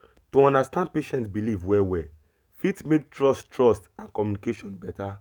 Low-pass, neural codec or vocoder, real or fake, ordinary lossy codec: 19.8 kHz; codec, 44.1 kHz, 7.8 kbps, DAC; fake; none